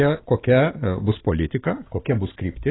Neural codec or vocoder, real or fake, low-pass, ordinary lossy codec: none; real; 7.2 kHz; AAC, 16 kbps